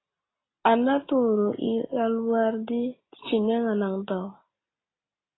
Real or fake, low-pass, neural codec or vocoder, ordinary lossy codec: real; 7.2 kHz; none; AAC, 16 kbps